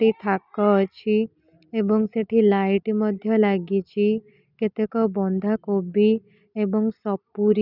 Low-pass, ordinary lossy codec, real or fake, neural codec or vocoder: 5.4 kHz; none; real; none